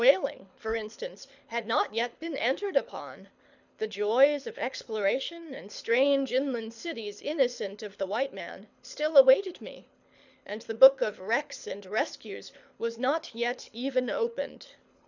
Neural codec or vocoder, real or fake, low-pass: codec, 24 kHz, 6 kbps, HILCodec; fake; 7.2 kHz